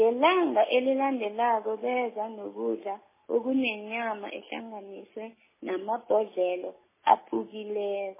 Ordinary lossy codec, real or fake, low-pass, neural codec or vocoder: MP3, 16 kbps; real; 3.6 kHz; none